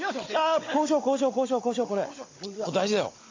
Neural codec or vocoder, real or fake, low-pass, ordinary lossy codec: codec, 16 kHz, 16 kbps, FunCodec, trained on Chinese and English, 50 frames a second; fake; 7.2 kHz; AAC, 32 kbps